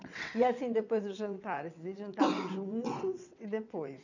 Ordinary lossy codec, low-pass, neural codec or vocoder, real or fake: none; 7.2 kHz; vocoder, 22.05 kHz, 80 mel bands, WaveNeXt; fake